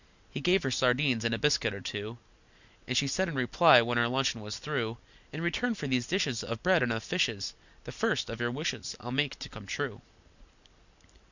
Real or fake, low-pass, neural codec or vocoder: real; 7.2 kHz; none